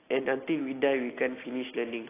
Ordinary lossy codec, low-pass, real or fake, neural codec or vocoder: AAC, 16 kbps; 3.6 kHz; real; none